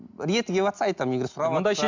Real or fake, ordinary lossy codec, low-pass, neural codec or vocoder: real; MP3, 64 kbps; 7.2 kHz; none